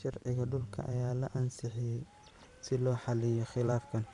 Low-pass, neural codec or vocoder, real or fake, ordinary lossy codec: 10.8 kHz; vocoder, 44.1 kHz, 128 mel bands every 512 samples, BigVGAN v2; fake; none